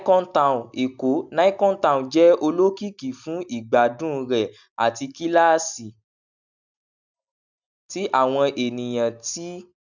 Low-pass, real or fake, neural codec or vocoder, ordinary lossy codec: 7.2 kHz; real; none; none